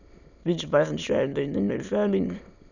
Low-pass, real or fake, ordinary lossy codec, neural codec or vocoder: 7.2 kHz; fake; none; autoencoder, 22.05 kHz, a latent of 192 numbers a frame, VITS, trained on many speakers